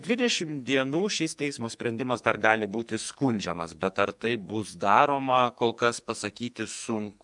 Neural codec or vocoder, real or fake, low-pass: codec, 44.1 kHz, 2.6 kbps, SNAC; fake; 10.8 kHz